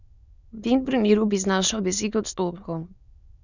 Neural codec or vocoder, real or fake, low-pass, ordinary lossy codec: autoencoder, 22.05 kHz, a latent of 192 numbers a frame, VITS, trained on many speakers; fake; 7.2 kHz; none